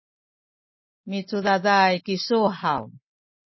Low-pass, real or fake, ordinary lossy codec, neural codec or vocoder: 7.2 kHz; real; MP3, 24 kbps; none